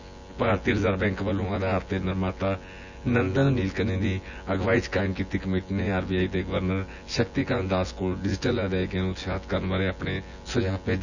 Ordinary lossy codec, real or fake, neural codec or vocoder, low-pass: none; fake; vocoder, 24 kHz, 100 mel bands, Vocos; 7.2 kHz